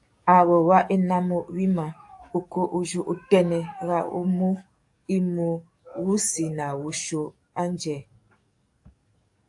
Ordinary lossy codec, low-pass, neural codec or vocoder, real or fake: AAC, 64 kbps; 10.8 kHz; codec, 44.1 kHz, 7.8 kbps, DAC; fake